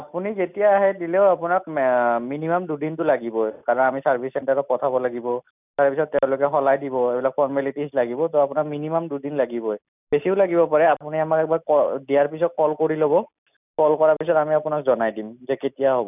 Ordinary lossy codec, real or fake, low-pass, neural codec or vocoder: none; real; 3.6 kHz; none